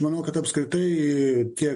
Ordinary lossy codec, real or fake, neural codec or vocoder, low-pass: MP3, 48 kbps; real; none; 10.8 kHz